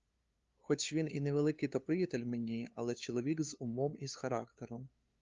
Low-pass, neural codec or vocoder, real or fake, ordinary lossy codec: 7.2 kHz; codec, 16 kHz, 2 kbps, FunCodec, trained on LibriTTS, 25 frames a second; fake; Opus, 32 kbps